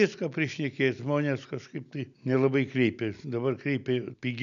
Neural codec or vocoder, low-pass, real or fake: none; 7.2 kHz; real